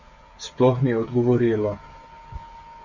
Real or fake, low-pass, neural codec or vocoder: fake; 7.2 kHz; codec, 16 kHz, 16 kbps, FreqCodec, smaller model